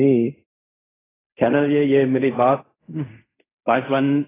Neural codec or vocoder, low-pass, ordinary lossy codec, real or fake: codec, 16 kHz in and 24 kHz out, 0.4 kbps, LongCat-Audio-Codec, fine tuned four codebook decoder; 3.6 kHz; AAC, 16 kbps; fake